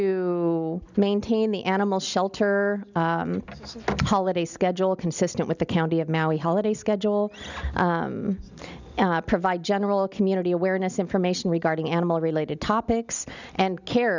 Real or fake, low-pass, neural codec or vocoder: real; 7.2 kHz; none